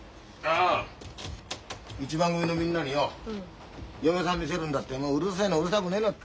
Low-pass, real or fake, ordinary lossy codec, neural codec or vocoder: none; real; none; none